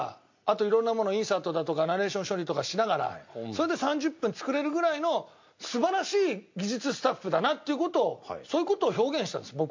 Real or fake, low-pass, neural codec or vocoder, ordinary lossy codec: real; 7.2 kHz; none; MP3, 48 kbps